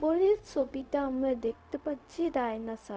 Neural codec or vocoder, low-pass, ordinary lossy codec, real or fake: codec, 16 kHz, 0.4 kbps, LongCat-Audio-Codec; none; none; fake